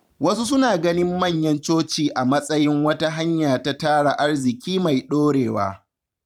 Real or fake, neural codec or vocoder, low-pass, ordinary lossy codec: fake; vocoder, 44.1 kHz, 128 mel bands every 512 samples, BigVGAN v2; 19.8 kHz; none